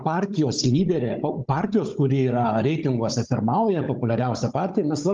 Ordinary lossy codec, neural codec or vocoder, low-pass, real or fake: Opus, 24 kbps; codec, 16 kHz, 16 kbps, FunCodec, trained on Chinese and English, 50 frames a second; 7.2 kHz; fake